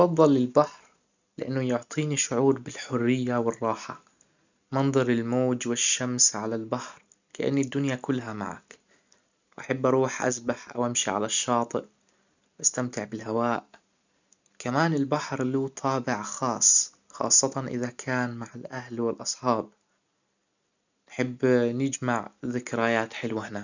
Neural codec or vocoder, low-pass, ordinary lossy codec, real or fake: none; 7.2 kHz; none; real